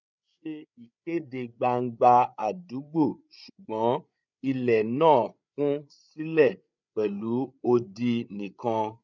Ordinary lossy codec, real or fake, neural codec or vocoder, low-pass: none; fake; codec, 16 kHz, 8 kbps, FreqCodec, larger model; 7.2 kHz